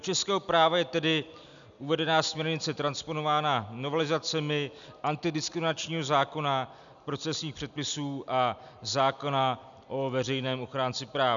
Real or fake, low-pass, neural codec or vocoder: real; 7.2 kHz; none